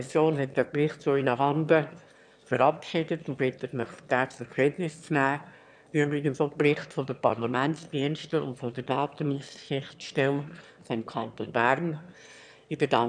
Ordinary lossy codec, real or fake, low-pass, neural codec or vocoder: none; fake; 9.9 kHz; autoencoder, 22.05 kHz, a latent of 192 numbers a frame, VITS, trained on one speaker